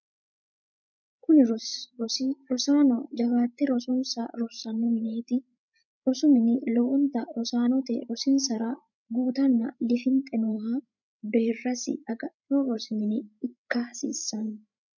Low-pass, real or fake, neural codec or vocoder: 7.2 kHz; fake; codec, 16 kHz, 16 kbps, FreqCodec, larger model